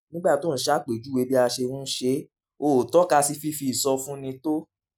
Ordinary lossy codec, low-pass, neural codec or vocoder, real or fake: none; none; none; real